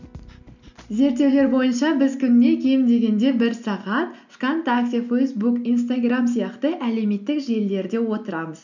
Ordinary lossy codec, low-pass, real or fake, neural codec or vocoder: none; 7.2 kHz; real; none